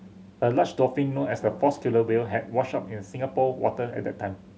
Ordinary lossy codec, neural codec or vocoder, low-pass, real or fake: none; none; none; real